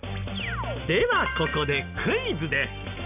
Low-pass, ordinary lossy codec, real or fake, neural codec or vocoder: 3.6 kHz; none; real; none